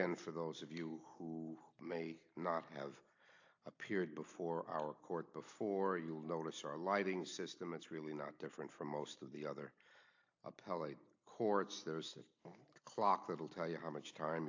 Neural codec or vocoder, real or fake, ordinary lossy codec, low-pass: none; real; AAC, 48 kbps; 7.2 kHz